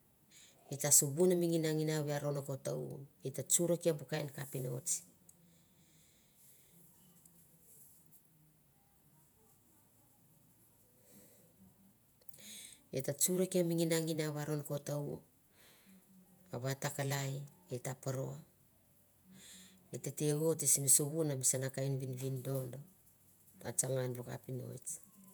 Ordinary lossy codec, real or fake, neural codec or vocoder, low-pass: none; fake; vocoder, 48 kHz, 128 mel bands, Vocos; none